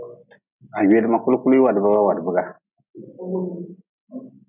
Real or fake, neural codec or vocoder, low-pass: real; none; 3.6 kHz